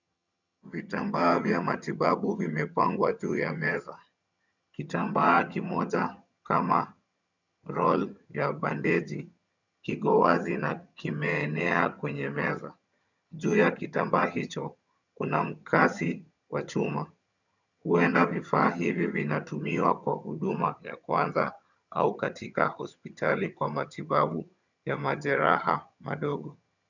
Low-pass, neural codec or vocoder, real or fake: 7.2 kHz; vocoder, 22.05 kHz, 80 mel bands, HiFi-GAN; fake